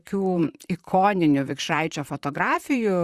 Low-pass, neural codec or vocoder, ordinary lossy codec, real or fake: 14.4 kHz; vocoder, 44.1 kHz, 128 mel bands, Pupu-Vocoder; Opus, 64 kbps; fake